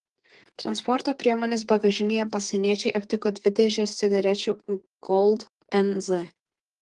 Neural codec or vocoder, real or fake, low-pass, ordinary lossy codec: codec, 32 kHz, 1.9 kbps, SNAC; fake; 10.8 kHz; Opus, 24 kbps